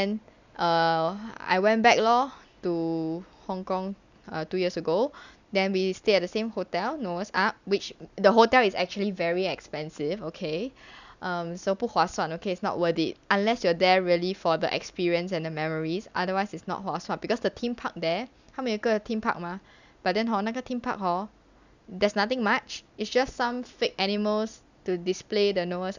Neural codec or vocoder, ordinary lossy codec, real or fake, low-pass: none; none; real; 7.2 kHz